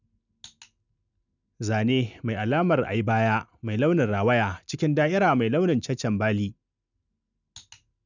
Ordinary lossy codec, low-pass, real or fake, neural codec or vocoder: none; 7.2 kHz; real; none